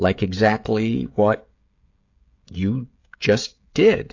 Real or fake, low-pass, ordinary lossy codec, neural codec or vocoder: fake; 7.2 kHz; AAC, 48 kbps; codec, 16 kHz, 16 kbps, FreqCodec, smaller model